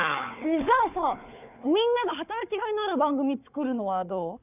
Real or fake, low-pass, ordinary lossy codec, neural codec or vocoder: fake; 3.6 kHz; none; codec, 16 kHz, 4 kbps, FunCodec, trained on Chinese and English, 50 frames a second